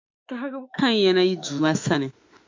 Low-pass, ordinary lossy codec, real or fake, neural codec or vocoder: 7.2 kHz; MP3, 48 kbps; fake; autoencoder, 48 kHz, 32 numbers a frame, DAC-VAE, trained on Japanese speech